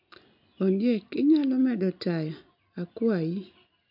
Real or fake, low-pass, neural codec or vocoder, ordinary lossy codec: real; 5.4 kHz; none; MP3, 48 kbps